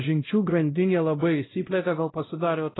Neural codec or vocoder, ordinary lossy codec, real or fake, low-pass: codec, 16 kHz, 0.5 kbps, X-Codec, WavLM features, trained on Multilingual LibriSpeech; AAC, 16 kbps; fake; 7.2 kHz